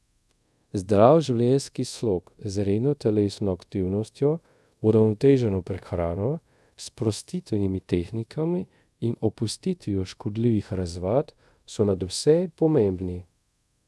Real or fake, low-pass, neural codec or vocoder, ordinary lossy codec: fake; none; codec, 24 kHz, 0.5 kbps, DualCodec; none